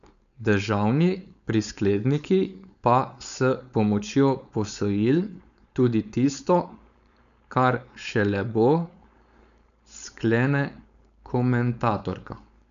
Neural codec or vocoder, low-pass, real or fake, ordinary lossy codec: codec, 16 kHz, 4.8 kbps, FACodec; 7.2 kHz; fake; none